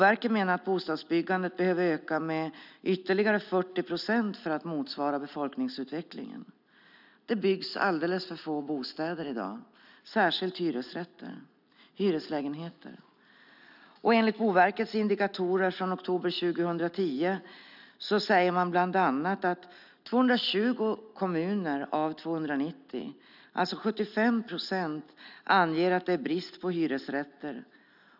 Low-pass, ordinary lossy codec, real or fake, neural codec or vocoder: 5.4 kHz; none; real; none